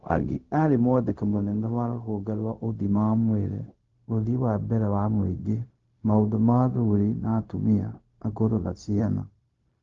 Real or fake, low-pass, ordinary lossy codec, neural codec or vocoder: fake; 7.2 kHz; Opus, 32 kbps; codec, 16 kHz, 0.4 kbps, LongCat-Audio-Codec